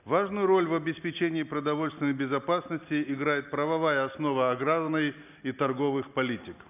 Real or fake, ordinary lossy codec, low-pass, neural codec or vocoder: real; none; 3.6 kHz; none